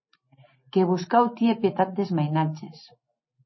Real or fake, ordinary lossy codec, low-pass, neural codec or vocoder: real; MP3, 24 kbps; 7.2 kHz; none